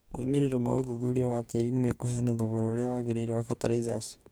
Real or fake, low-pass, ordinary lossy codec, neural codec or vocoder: fake; none; none; codec, 44.1 kHz, 2.6 kbps, DAC